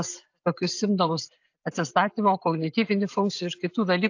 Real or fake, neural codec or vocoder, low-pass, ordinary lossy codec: real; none; 7.2 kHz; AAC, 48 kbps